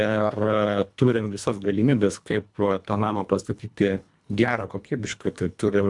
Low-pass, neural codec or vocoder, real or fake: 10.8 kHz; codec, 24 kHz, 1.5 kbps, HILCodec; fake